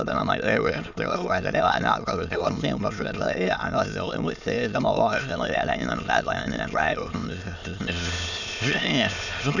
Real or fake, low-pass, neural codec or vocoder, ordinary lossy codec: fake; 7.2 kHz; autoencoder, 22.05 kHz, a latent of 192 numbers a frame, VITS, trained on many speakers; none